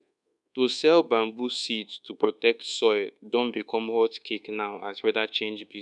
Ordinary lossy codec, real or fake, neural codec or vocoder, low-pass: none; fake; codec, 24 kHz, 1.2 kbps, DualCodec; 10.8 kHz